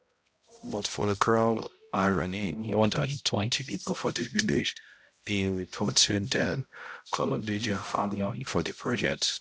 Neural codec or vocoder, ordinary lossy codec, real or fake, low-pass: codec, 16 kHz, 0.5 kbps, X-Codec, HuBERT features, trained on balanced general audio; none; fake; none